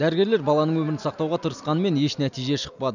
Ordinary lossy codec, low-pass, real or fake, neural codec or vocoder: none; 7.2 kHz; real; none